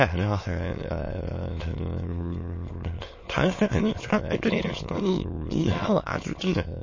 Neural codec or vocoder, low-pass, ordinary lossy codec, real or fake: autoencoder, 22.05 kHz, a latent of 192 numbers a frame, VITS, trained on many speakers; 7.2 kHz; MP3, 32 kbps; fake